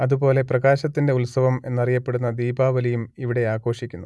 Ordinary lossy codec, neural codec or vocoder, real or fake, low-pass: none; none; real; none